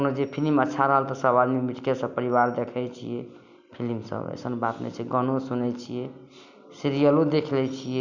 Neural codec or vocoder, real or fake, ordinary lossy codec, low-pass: none; real; none; 7.2 kHz